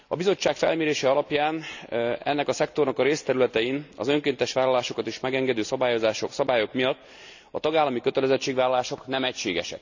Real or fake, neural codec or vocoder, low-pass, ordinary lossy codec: real; none; 7.2 kHz; none